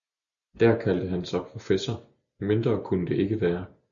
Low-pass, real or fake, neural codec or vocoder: 7.2 kHz; real; none